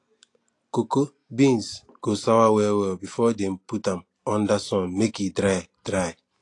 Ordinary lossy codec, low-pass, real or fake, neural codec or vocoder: AAC, 48 kbps; 10.8 kHz; real; none